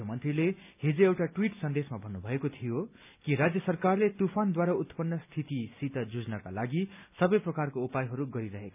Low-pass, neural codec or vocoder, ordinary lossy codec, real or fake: 3.6 kHz; none; none; real